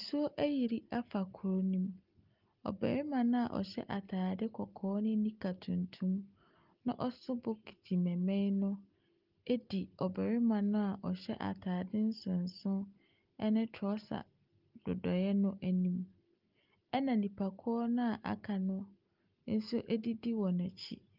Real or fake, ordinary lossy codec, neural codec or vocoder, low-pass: real; Opus, 32 kbps; none; 5.4 kHz